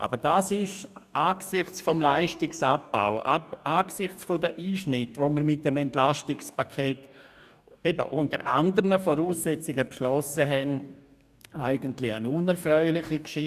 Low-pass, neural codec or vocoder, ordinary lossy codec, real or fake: 14.4 kHz; codec, 44.1 kHz, 2.6 kbps, DAC; none; fake